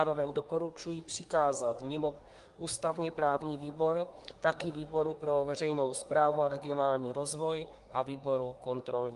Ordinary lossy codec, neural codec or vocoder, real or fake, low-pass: Opus, 32 kbps; codec, 24 kHz, 1 kbps, SNAC; fake; 10.8 kHz